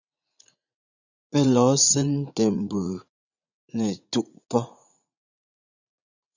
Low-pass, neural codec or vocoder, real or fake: 7.2 kHz; vocoder, 44.1 kHz, 80 mel bands, Vocos; fake